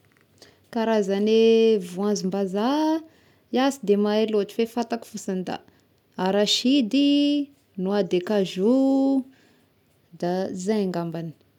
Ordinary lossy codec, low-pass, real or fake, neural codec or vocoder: none; 19.8 kHz; real; none